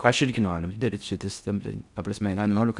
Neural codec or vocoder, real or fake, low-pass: codec, 16 kHz in and 24 kHz out, 0.6 kbps, FocalCodec, streaming, 4096 codes; fake; 10.8 kHz